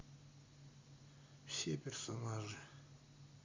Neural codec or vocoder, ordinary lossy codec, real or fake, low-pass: none; MP3, 48 kbps; real; 7.2 kHz